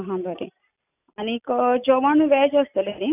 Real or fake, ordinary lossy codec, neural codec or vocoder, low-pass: real; none; none; 3.6 kHz